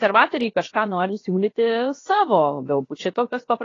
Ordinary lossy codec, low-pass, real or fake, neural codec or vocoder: AAC, 32 kbps; 7.2 kHz; fake; codec, 16 kHz, about 1 kbps, DyCAST, with the encoder's durations